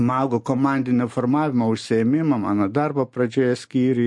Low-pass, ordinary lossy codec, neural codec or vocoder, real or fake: 10.8 kHz; MP3, 48 kbps; none; real